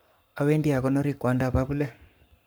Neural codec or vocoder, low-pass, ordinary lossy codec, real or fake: codec, 44.1 kHz, 7.8 kbps, Pupu-Codec; none; none; fake